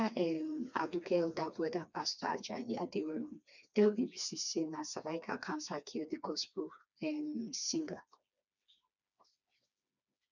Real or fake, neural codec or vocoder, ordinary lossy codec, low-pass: fake; codec, 16 kHz, 2 kbps, FreqCodec, smaller model; none; 7.2 kHz